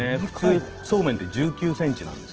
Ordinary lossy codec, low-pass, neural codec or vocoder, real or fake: Opus, 16 kbps; 7.2 kHz; none; real